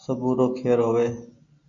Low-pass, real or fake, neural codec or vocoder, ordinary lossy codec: 7.2 kHz; real; none; MP3, 64 kbps